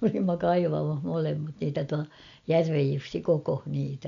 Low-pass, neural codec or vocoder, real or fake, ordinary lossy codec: 7.2 kHz; none; real; none